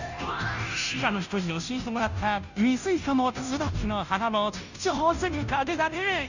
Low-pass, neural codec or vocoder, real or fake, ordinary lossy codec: 7.2 kHz; codec, 16 kHz, 0.5 kbps, FunCodec, trained on Chinese and English, 25 frames a second; fake; none